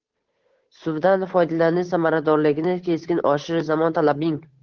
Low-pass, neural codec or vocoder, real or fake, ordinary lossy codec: 7.2 kHz; codec, 16 kHz, 8 kbps, FunCodec, trained on Chinese and English, 25 frames a second; fake; Opus, 16 kbps